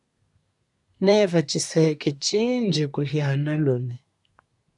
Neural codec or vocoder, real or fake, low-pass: codec, 24 kHz, 1 kbps, SNAC; fake; 10.8 kHz